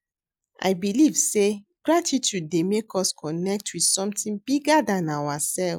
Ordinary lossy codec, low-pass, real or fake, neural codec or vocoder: none; none; real; none